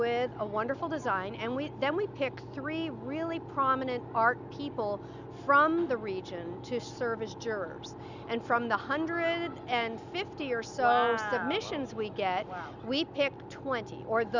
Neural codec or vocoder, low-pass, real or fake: none; 7.2 kHz; real